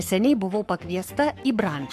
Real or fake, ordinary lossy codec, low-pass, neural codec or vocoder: fake; MP3, 96 kbps; 14.4 kHz; codec, 44.1 kHz, 7.8 kbps, Pupu-Codec